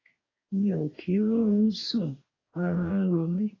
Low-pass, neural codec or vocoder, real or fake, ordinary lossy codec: 7.2 kHz; codec, 44.1 kHz, 2.6 kbps, DAC; fake; AAC, 32 kbps